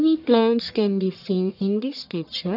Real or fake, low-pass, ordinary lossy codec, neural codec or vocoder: fake; 5.4 kHz; none; codec, 44.1 kHz, 1.7 kbps, Pupu-Codec